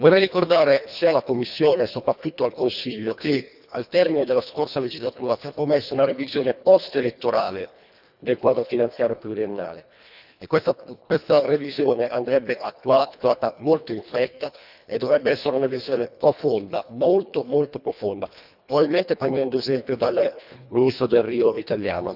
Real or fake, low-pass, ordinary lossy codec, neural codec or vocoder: fake; 5.4 kHz; none; codec, 24 kHz, 1.5 kbps, HILCodec